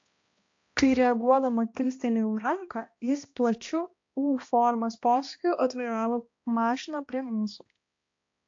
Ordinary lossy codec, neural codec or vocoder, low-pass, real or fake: AAC, 48 kbps; codec, 16 kHz, 1 kbps, X-Codec, HuBERT features, trained on balanced general audio; 7.2 kHz; fake